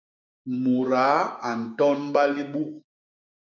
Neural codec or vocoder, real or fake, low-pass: codec, 16 kHz, 6 kbps, DAC; fake; 7.2 kHz